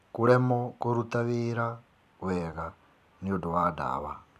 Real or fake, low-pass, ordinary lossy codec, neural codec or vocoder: fake; 14.4 kHz; none; vocoder, 44.1 kHz, 128 mel bands every 256 samples, BigVGAN v2